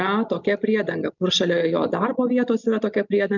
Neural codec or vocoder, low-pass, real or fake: none; 7.2 kHz; real